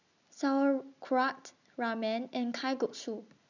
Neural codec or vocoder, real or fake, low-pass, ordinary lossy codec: none; real; 7.2 kHz; none